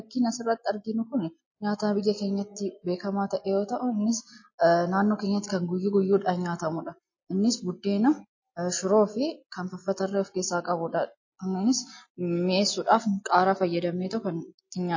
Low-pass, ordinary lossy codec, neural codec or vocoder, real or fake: 7.2 kHz; MP3, 32 kbps; none; real